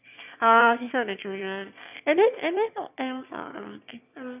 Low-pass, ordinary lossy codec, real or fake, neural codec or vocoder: 3.6 kHz; none; fake; autoencoder, 22.05 kHz, a latent of 192 numbers a frame, VITS, trained on one speaker